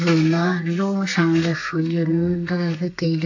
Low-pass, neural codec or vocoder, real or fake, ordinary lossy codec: 7.2 kHz; codec, 32 kHz, 1.9 kbps, SNAC; fake; AAC, 48 kbps